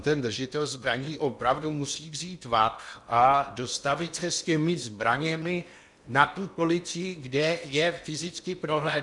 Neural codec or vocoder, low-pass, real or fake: codec, 16 kHz in and 24 kHz out, 0.8 kbps, FocalCodec, streaming, 65536 codes; 10.8 kHz; fake